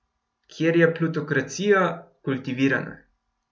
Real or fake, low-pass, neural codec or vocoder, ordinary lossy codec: real; none; none; none